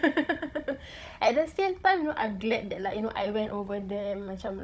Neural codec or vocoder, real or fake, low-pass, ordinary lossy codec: codec, 16 kHz, 16 kbps, FunCodec, trained on Chinese and English, 50 frames a second; fake; none; none